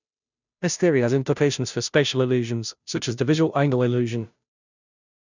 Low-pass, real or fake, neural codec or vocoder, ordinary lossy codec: 7.2 kHz; fake; codec, 16 kHz, 0.5 kbps, FunCodec, trained on Chinese and English, 25 frames a second; none